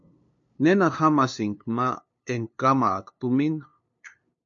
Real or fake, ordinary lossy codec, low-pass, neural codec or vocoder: fake; MP3, 48 kbps; 7.2 kHz; codec, 16 kHz, 2 kbps, FunCodec, trained on LibriTTS, 25 frames a second